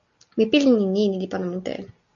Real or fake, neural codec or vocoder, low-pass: real; none; 7.2 kHz